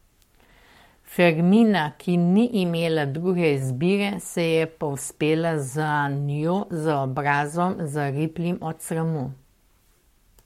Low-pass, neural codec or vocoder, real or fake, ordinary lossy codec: 19.8 kHz; codec, 44.1 kHz, 7.8 kbps, Pupu-Codec; fake; MP3, 64 kbps